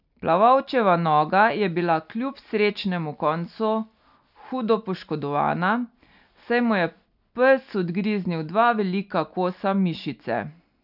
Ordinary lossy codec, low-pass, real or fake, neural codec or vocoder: none; 5.4 kHz; real; none